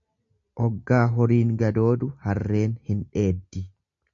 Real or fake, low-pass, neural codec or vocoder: real; 7.2 kHz; none